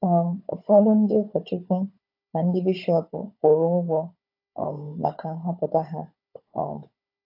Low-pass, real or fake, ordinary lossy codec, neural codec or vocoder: 5.4 kHz; fake; AAC, 48 kbps; codec, 16 kHz, 16 kbps, FunCodec, trained on Chinese and English, 50 frames a second